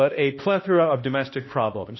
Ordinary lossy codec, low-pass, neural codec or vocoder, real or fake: MP3, 24 kbps; 7.2 kHz; codec, 16 kHz, 1 kbps, X-Codec, HuBERT features, trained on balanced general audio; fake